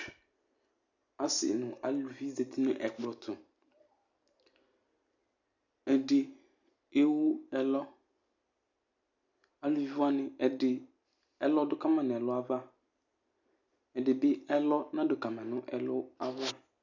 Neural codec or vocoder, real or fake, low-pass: none; real; 7.2 kHz